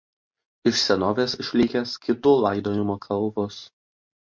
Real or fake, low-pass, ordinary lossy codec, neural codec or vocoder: real; 7.2 kHz; MP3, 48 kbps; none